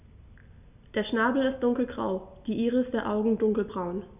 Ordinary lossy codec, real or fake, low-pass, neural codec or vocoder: none; real; 3.6 kHz; none